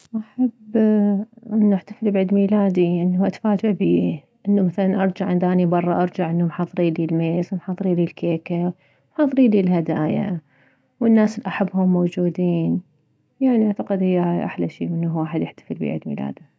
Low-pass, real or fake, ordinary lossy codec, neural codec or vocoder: none; real; none; none